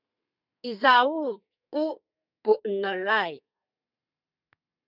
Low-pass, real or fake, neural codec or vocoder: 5.4 kHz; fake; codec, 32 kHz, 1.9 kbps, SNAC